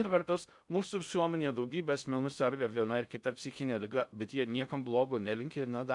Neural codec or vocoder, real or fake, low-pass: codec, 16 kHz in and 24 kHz out, 0.6 kbps, FocalCodec, streaming, 2048 codes; fake; 10.8 kHz